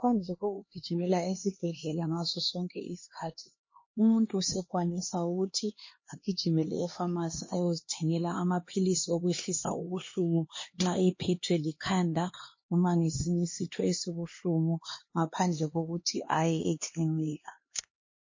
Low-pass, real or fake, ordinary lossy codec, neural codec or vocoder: 7.2 kHz; fake; MP3, 32 kbps; codec, 16 kHz, 2 kbps, X-Codec, HuBERT features, trained on LibriSpeech